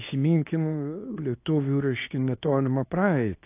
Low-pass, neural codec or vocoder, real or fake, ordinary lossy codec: 3.6 kHz; codec, 24 kHz, 0.9 kbps, WavTokenizer, medium speech release version 2; fake; AAC, 24 kbps